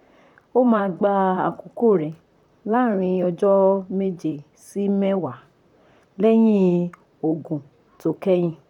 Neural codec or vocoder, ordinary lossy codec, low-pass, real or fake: vocoder, 44.1 kHz, 128 mel bands, Pupu-Vocoder; none; 19.8 kHz; fake